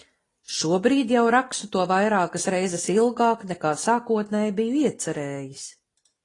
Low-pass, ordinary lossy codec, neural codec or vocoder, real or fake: 10.8 kHz; AAC, 32 kbps; none; real